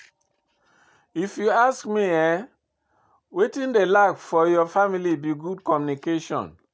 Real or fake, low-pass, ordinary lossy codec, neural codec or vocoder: real; none; none; none